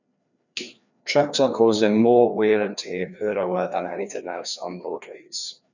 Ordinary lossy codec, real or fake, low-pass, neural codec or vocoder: none; fake; 7.2 kHz; codec, 16 kHz, 2 kbps, FreqCodec, larger model